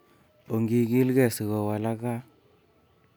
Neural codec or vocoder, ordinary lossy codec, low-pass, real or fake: none; none; none; real